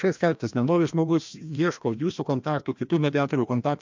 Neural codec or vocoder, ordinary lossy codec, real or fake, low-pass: codec, 16 kHz, 1 kbps, FreqCodec, larger model; MP3, 64 kbps; fake; 7.2 kHz